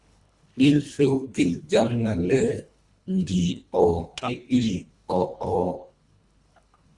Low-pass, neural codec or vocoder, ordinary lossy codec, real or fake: 10.8 kHz; codec, 24 kHz, 1.5 kbps, HILCodec; Opus, 64 kbps; fake